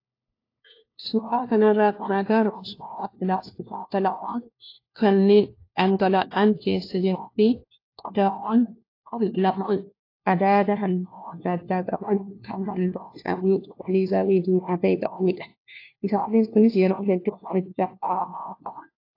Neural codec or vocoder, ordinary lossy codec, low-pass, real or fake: codec, 16 kHz, 1 kbps, FunCodec, trained on LibriTTS, 50 frames a second; AAC, 32 kbps; 5.4 kHz; fake